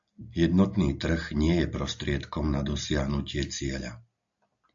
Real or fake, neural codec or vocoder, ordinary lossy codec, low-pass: real; none; AAC, 64 kbps; 7.2 kHz